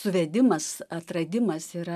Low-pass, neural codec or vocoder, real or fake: 14.4 kHz; none; real